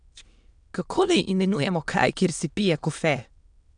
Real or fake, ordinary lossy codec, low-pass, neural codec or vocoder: fake; none; 9.9 kHz; autoencoder, 22.05 kHz, a latent of 192 numbers a frame, VITS, trained on many speakers